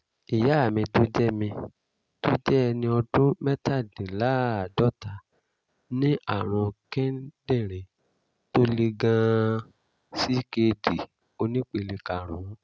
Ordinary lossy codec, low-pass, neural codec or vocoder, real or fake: none; none; none; real